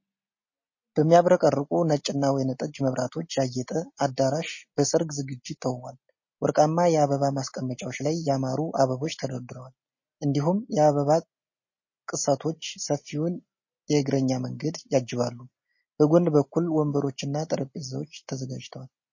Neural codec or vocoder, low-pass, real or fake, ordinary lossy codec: none; 7.2 kHz; real; MP3, 32 kbps